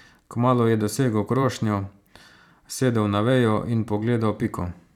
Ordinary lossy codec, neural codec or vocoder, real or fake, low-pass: none; vocoder, 44.1 kHz, 128 mel bands every 512 samples, BigVGAN v2; fake; 19.8 kHz